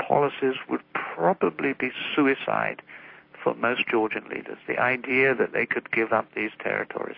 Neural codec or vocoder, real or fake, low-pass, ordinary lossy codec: none; real; 5.4 kHz; MP3, 32 kbps